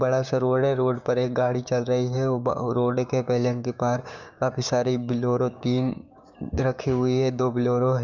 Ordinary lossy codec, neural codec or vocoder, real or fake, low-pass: none; codec, 16 kHz, 6 kbps, DAC; fake; 7.2 kHz